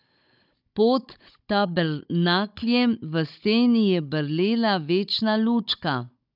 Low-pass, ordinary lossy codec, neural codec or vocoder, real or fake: 5.4 kHz; none; codec, 16 kHz, 16 kbps, FunCodec, trained on Chinese and English, 50 frames a second; fake